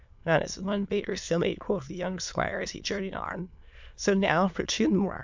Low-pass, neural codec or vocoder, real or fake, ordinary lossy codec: 7.2 kHz; autoencoder, 22.05 kHz, a latent of 192 numbers a frame, VITS, trained on many speakers; fake; MP3, 64 kbps